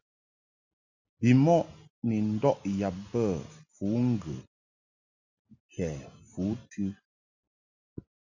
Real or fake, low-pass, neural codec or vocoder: real; 7.2 kHz; none